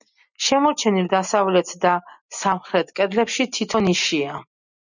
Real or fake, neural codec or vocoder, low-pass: real; none; 7.2 kHz